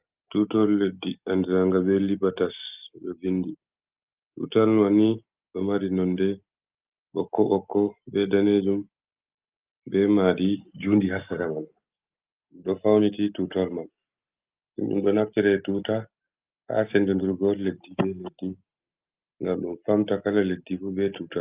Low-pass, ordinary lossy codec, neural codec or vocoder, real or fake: 3.6 kHz; Opus, 24 kbps; none; real